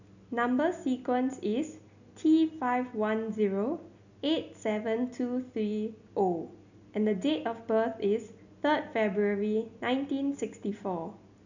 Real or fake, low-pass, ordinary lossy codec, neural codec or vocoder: real; 7.2 kHz; none; none